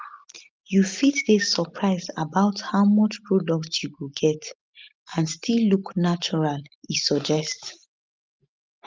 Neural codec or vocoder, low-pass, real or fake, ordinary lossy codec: none; 7.2 kHz; real; Opus, 32 kbps